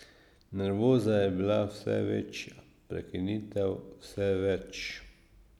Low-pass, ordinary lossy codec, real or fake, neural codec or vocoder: 19.8 kHz; none; real; none